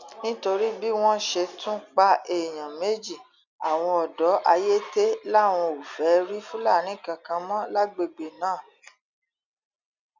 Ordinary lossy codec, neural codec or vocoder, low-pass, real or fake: none; none; 7.2 kHz; real